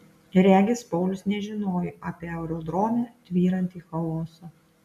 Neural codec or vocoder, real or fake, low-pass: none; real; 14.4 kHz